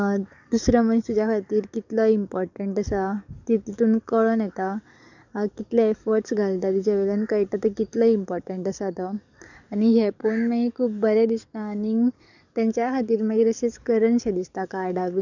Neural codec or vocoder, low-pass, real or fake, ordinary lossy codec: codec, 44.1 kHz, 7.8 kbps, DAC; 7.2 kHz; fake; none